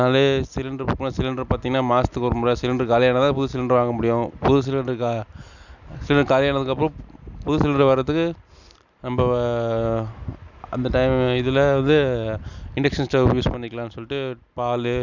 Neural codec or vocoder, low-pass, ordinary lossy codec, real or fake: none; 7.2 kHz; none; real